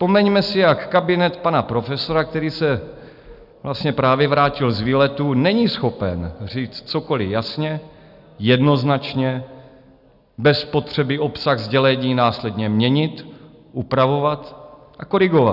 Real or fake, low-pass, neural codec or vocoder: real; 5.4 kHz; none